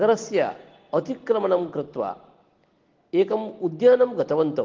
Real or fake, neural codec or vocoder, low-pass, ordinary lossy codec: real; none; 7.2 kHz; Opus, 16 kbps